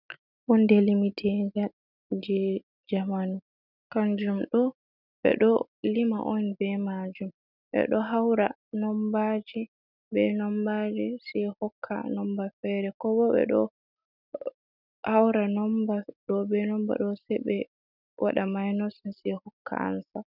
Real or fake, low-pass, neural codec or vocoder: real; 5.4 kHz; none